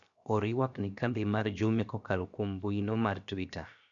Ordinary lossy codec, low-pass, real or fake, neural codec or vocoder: none; 7.2 kHz; fake; codec, 16 kHz, 0.7 kbps, FocalCodec